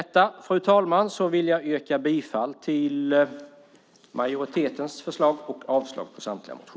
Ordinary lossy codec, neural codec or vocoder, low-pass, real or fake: none; none; none; real